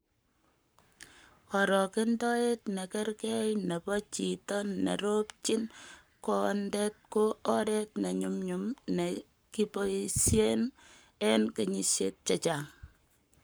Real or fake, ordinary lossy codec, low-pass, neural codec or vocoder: fake; none; none; codec, 44.1 kHz, 7.8 kbps, Pupu-Codec